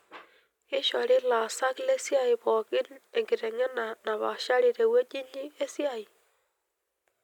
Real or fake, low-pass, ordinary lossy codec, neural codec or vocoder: real; 19.8 kHz; none; none